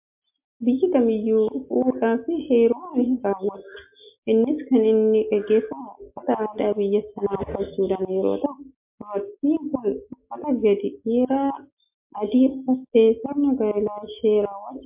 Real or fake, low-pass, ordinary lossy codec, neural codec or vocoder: real; 3.6 kHz; MP3, 32 kbps; none